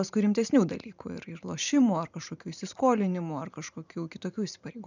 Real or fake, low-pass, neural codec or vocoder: fake; 7.2 kHz; vocoder, 44.1 kHz, 128 mel bands every 512 samples, BigVGAN v2